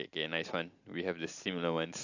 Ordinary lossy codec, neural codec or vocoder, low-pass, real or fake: AAC, 48 kbps; none; 7.2 kHz; real